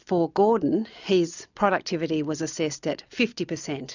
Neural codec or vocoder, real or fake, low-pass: none; real; 7.2 kHz